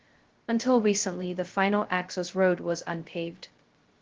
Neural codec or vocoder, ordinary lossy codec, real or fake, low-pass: codec, 16 kHz, 0.2 kbps, FocalCodec; Opus, 16 kbps; fake; 7.2 kHz